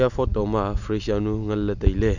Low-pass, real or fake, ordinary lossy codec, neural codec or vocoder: 7.2 kHz; real; none; none